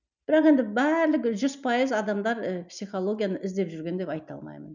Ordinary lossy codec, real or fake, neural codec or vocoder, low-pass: none; real; none; 7.2 kHz